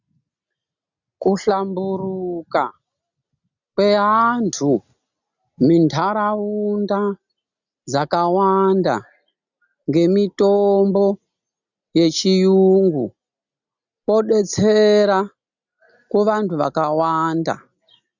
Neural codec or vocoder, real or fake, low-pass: none; real; 7.2 kHz